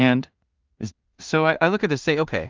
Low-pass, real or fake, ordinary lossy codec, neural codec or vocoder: 7.2 kHz; fake; Opus, 24 kbps; codec, 16 kHz in and 24 kHz out, 0.4 kbps, LongCat-Audio-Codec, two codebook decoder